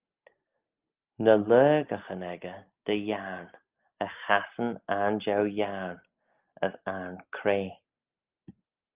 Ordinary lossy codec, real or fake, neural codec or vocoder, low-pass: Opus, 24 kbps; real; none; 3.6 kHz